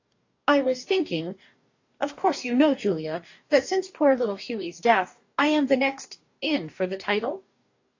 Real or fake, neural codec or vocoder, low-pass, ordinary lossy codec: fake; codec, 44.1 kHz, 2.6 kbps, DAC; 7.2 kHz; AAC, 48 kbps